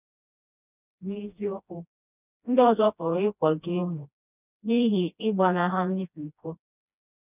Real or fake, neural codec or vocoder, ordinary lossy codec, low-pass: fake; codec, 16 kHz, 1 kbps, FreqCodec, smaller model; none; 3.6 kHz